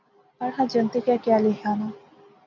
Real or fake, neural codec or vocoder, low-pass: real; none; 7.2 kHz